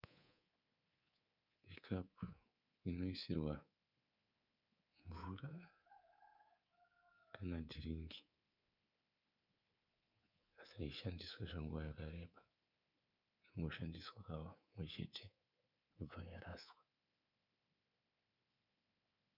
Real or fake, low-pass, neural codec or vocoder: fake; 5.4 kHz; codec, 24 kHz, 3.1 kbps, DualCodec